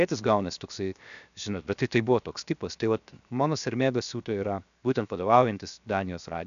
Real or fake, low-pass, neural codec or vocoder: fake; 7.2 kHz; codec, 16 kHz, 0.7 kbps, FocalCodec